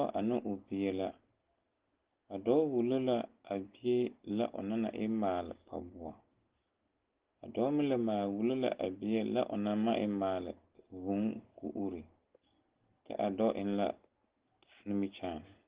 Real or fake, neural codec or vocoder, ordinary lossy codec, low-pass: real; none; Opus, 16 kbps; 3.6 kHz